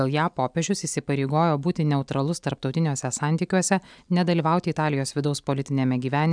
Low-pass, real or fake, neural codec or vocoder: 9.9 kHz; real; none